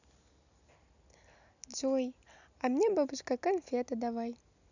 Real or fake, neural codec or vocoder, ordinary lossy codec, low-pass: real; none; none; 7.2 kHz